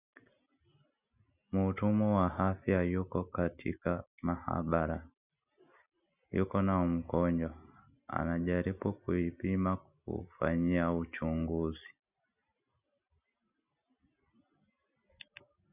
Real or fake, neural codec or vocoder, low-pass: real; none; 3.6 kHz